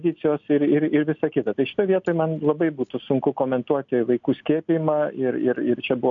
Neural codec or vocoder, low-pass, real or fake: none; 7.2 kHz; real